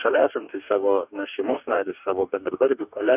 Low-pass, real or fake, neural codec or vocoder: 3.6 kHz; fake; codec, 44.1 kHz, 2.6 kbps, DAC